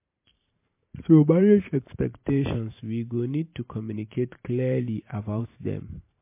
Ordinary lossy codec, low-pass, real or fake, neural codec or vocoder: MP3, 24 kbps; 3.6 kHz; fake; vocoder, 44.1 kHz, 128 mel bands every 512 samples, BigVGAN v2